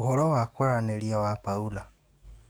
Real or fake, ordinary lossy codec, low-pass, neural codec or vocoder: fake; none; none; codec, 44.1 kHz, 7.8 kbps, DAC